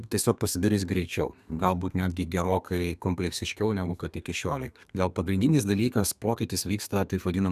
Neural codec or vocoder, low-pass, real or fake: codec, 32 kHz, 1.9 kbps, SNAC; 14.4 kHz; fake